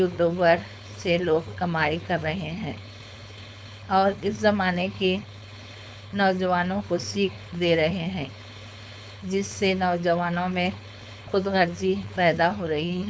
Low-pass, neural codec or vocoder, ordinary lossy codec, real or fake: none; codec, 16 kHz, 4.8 kbps, FACodec; none; fake